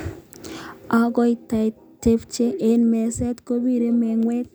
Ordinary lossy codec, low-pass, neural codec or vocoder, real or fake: none; none; none; real